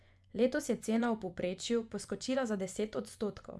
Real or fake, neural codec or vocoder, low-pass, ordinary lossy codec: fake; vocoder, 24 kHz, 100 mel bands, Vocos; none; none